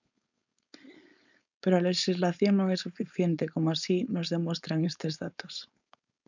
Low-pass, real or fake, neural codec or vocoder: 7.2 kHz; fake; codec, 16 kHz, 4.8 kbps, FACodec